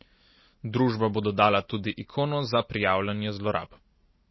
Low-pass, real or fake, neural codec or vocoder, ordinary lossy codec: 7.2 kHz; real; none; MP3, 24 kbps